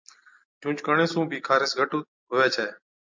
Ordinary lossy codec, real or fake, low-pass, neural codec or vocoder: MP3, 64 kbps; real; 7.2 kHz; none